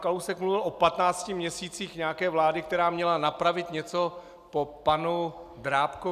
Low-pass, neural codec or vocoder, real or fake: 14.4 kHz; none; real